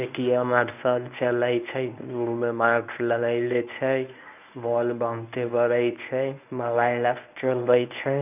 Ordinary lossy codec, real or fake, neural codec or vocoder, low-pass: none; fake; codec, 24 kHz, 0.9 kbps, WavTokenizer, medium speech release version 1; 3.6 kHz